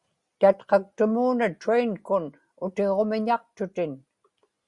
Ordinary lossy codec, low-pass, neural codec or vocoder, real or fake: Opus, 64 kbps; 10.8 kHz; none; real